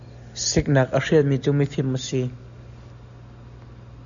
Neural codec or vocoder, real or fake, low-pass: none; real; 7.2 kHz